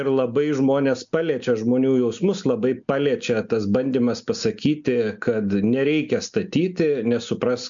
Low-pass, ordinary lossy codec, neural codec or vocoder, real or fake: 7.2 kHz; MP3, 64 kbps; none; real